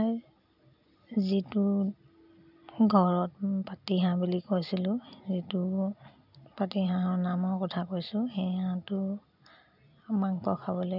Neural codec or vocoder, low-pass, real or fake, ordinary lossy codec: none; 5.4 kHz; real; none